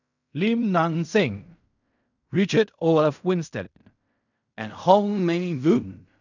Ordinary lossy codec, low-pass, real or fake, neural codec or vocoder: none; 7.2 kHz; fake; codec, 16 kHz in and 24 kHz out, 0.4 kbps, LongCat-Audio-Codec, fine tuned four codebook decoder